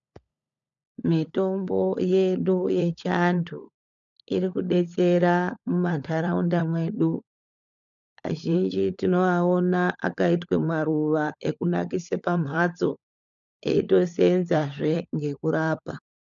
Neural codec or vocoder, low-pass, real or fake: codec, 16 kHz, 16 kbps, FunCodec, trained on LibriTTS, 50 frames a second; 7.2 kHz; fake